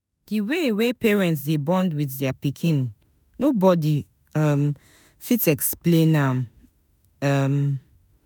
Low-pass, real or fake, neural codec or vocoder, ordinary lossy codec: none; fake; autoencoder, 48 kHz, 32 numbers a frame, DAC-VAE, trained on Japanese speech; none